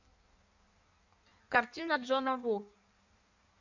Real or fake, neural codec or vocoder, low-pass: fake; codec, 16 kHz in and 24 kHz out, 1.1 kbps, FireRedTTS-2 codec; 7.2 kHz